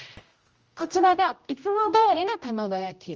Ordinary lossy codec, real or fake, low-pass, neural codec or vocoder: Opus, 24 kbps; fake; 7.2 kHz; codec, 16 kHz, 0.5 kbps, X-Codec, HuBERT features, trained on general audio